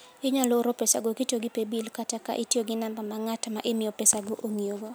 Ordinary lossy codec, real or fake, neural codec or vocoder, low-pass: none; real; none; none